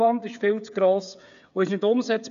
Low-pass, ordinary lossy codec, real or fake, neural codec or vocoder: 7.2 kHz; none; fake; codec, 16 kHz, 8 kbps, FreqCodec, smaller model